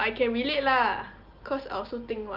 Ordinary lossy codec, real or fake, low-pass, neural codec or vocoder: Opus, 32 kbps; real; 5.4 kHz; none